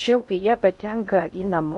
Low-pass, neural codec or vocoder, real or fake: 10.8 kHz; codec, 16 kHz in and 24 kHz out, 0.6 kbps, FocalCodec, streaming, 2048 codes; fake